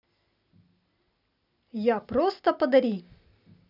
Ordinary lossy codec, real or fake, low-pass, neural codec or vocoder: none; real; 5.4 kHz; none